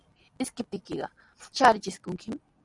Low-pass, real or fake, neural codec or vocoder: 10.8 kHz; real; none